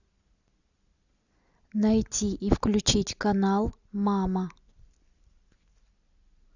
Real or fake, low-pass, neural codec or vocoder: real; 7.2 kHz; none